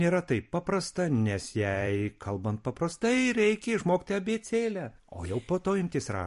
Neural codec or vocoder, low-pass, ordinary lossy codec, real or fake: vocoder, 44.1 kHz, 128 mel bands every 256 samples, BigVGAN v2; 14.4 kHz; MP3, 48 kbps; fake